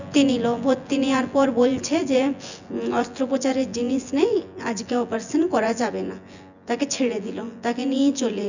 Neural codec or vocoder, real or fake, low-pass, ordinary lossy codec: vocoder, 24 kHz, 100 mel bands, Vocos; fake; 7.2 kHz; none